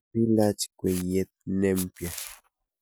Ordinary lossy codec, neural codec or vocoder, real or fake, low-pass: none; none; real; none